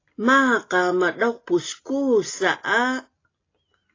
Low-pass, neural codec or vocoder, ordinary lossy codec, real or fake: 7.2 kHz; none; AAC, 32 kbps; real